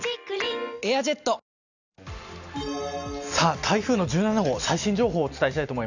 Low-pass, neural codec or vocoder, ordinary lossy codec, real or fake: 7.2 kHz; none; none; real